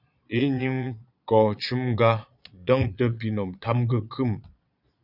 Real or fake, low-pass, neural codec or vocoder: fake; 5.4 kHz; vocoder, 22.05 kHz, 80 mel bands, Vocos